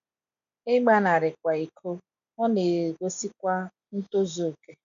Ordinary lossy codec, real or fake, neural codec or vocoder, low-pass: none; real; none; 7.2 kHz